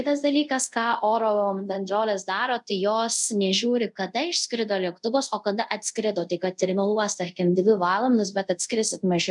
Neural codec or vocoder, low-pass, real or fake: codec, 24 kHz, 0.5 kbps, DualCodec; 10.8 kHz; fake